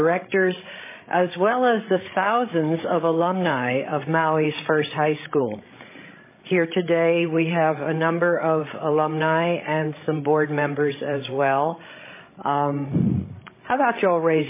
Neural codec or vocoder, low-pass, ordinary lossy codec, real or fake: codec, 16 kHz, 16 kbps, FreqCodec, larger model; 3.6 kHz; MP3, 16 kbps; fake